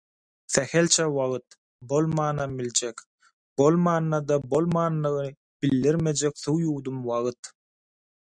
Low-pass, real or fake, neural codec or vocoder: 9.9 kHz; real; none